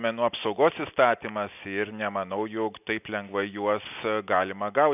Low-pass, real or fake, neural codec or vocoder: 3.6 kHz; real; none